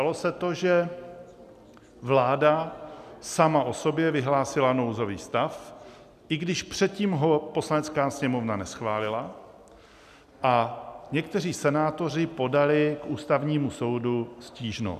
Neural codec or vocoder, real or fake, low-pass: none; real; 14.4 kHz